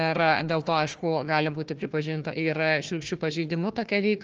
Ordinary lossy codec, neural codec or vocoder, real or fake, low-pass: Opus, 24 kbps; codec, 16 kHz, 1 kbps, FunCodec, trained on Chinese and English, 50 frames a second; fake; 7.2 kHz